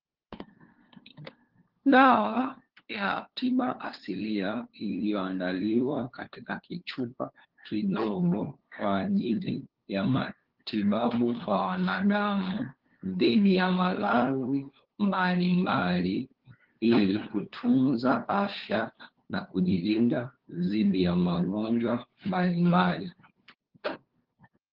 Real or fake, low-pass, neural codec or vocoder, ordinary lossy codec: fake; 5.4 kHz; codec, 16 kHz, 2 kbps, FunCodec, trained on LibriTTS, 25 frames a second; Opus, 16 kbps